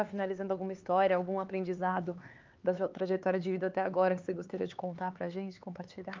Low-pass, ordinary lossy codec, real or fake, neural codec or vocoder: 7.2 kHz; Opus, 24 kbps; fake; codec, 16 kHz, 4 kbps, X-Codec, HuBERT features, trained on LibriSpeech